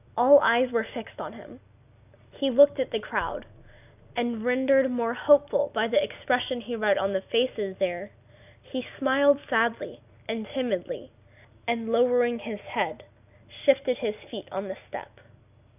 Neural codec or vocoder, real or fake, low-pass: none; real; 3.6 kHz